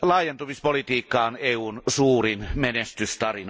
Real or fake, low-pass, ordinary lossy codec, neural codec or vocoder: real; none; none; none